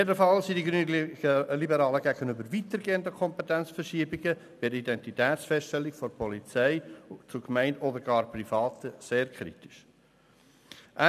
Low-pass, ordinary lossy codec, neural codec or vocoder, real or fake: 14.4 kHz; none; none; real